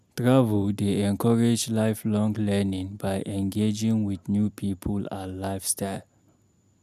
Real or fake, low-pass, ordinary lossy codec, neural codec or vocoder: real; 14.4 kHz; none; none